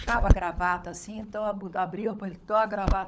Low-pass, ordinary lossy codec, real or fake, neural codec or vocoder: none; none; fake; codec, 16 kHz, 16 kbps, FunCodec, trained on LibriTTS, 50 frames a second